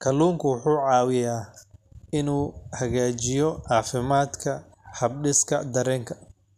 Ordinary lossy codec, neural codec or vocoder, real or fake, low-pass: none; none; real; 14.4 kHz